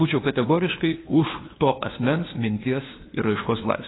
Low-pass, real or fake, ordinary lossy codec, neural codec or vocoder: 7.2 kHz; fake; AAC, 16 kbps; codec, 16 kHz, 2 kbps, FunCodec, trained on LibriTTS, 25 frames a second